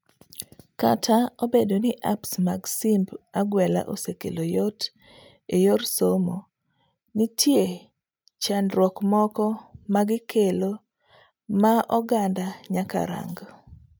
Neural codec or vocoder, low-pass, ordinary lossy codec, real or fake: none; none; none; real